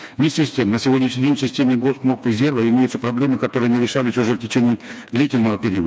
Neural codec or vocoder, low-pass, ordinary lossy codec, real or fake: codec, 16 kHz, 2 kbps, FreqCodec, smaller model; none; none; fake